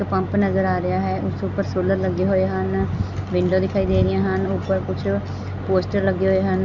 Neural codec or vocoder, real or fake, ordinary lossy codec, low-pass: none; real; none; 7.2 kHz